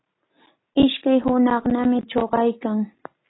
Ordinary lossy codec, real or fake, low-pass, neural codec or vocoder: AAC, 16 kbps; real; 7.2 kHz; none